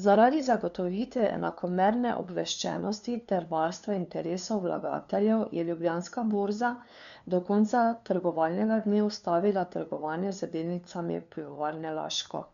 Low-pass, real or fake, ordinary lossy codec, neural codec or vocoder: 7.2 kHz; fake; none; codec, 16 kHz, 2 kbps, FunCodec, trained on LibriTTS, 25 frames a second